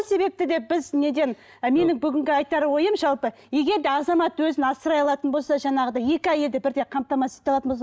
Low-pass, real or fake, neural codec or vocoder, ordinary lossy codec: none; real; none; none